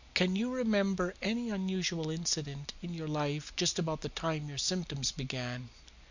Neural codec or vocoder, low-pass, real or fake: none; 7.2 kHz; real